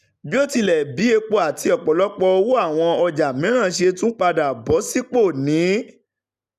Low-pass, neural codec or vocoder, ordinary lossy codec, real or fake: 14.4 kHz; none; none; real